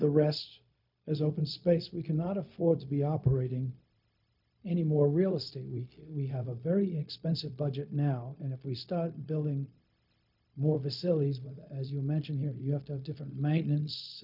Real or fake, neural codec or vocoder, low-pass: fake; codec, 16 kHz, 0.4 kbps, LongCat-Audio-Codec; 5.4 kHz